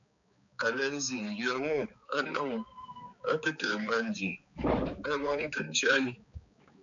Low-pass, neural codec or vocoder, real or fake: 7.2 kHz; codec, 16 kHz, 4 kbps, X-Codec, HuBERT features, trained on balanced general audio; fake